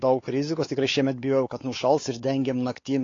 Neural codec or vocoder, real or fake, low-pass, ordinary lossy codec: codec, 16 kHz, 4 kbps, X-Codec, WavLM features, trained on Multilingual LibriSpeech; fake; 7.2 kHz; AAC, 32 kbps